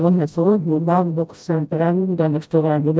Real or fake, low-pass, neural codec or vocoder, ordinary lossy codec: fake; none; codec, 16 kHz, 0.5 kbps, FreqCodec, smaller model; none